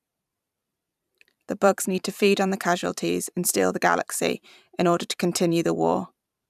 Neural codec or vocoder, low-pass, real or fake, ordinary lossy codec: vocoder, 44.1 kHz, 128 mel bands every 512 samples, BigVGAN v2; 14.4 kHz; fake; none